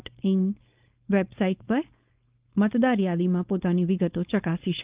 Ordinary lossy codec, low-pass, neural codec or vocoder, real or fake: Opus, 32 kbps; 3.6 kHz; codec, 16 kHz, 4.8 kbps, FACodec; fake